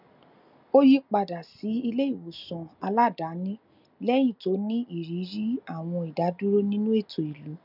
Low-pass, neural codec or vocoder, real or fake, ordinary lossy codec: 5.4 kHz; none; real; none